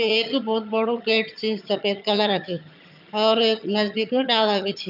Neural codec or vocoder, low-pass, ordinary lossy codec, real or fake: vocoder, 22.05 kHz, 80 mel bands, HiFi-GAN; 5.4 kHz; none; fake